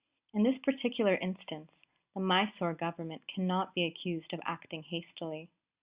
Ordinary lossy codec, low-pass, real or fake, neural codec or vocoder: Opus, 64 kbps; 3.6 kHz; real; none